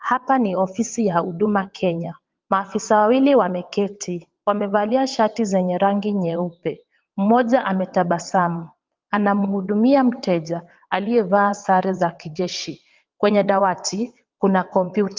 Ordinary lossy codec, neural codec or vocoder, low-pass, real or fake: Opus, 32 kbps; vocoder, 22.05 kHz, 80 mel bands, WaveNeXt; 7.2 kHz; fake